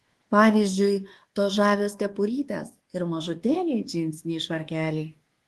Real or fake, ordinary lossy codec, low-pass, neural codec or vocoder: fake; Opus, 16 kbps; 10.8 kHz; codec, 24 kHz, 1.2 kbps, DualCodec